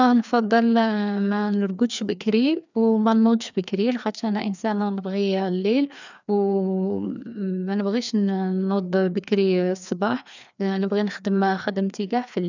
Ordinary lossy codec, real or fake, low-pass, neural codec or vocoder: none; fake; 7.2 kHz; codec, 16 kHz, 2 kbps, FreqCodec, larger model